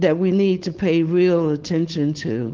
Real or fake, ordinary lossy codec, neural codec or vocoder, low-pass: fake; Opus, 16 kbps; codec, 16 kHz, 4.8 kbps, FACodec; 7.2 kHz